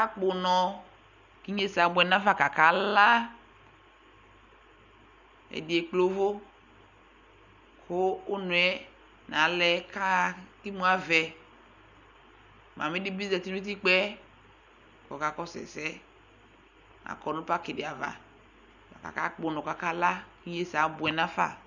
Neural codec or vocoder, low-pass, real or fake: none; 7.2 kHz; real